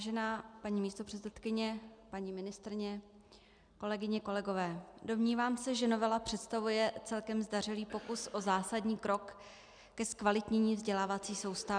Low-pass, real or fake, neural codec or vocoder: 9.9 kHz; real; none